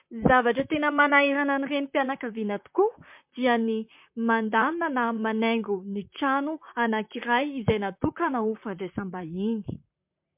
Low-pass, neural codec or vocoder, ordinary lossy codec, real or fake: 3.6 kHz; vocoder, 44.1 kHz, 128 mel bands, Pupu-Vocoder; MP3, 32 kbps; fake